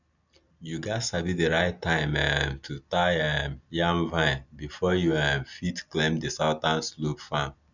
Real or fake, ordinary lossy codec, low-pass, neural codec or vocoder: real; none; 7.2 kHz; none